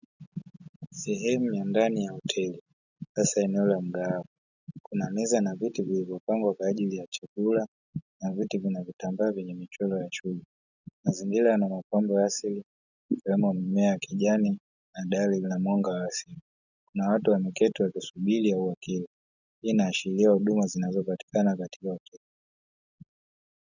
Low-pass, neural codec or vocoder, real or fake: 7.2 kHz; none; real